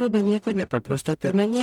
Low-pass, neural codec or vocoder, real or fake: 19.8 kHz; codec, 44.1 kHz, 0.9 kbps, DAC; fake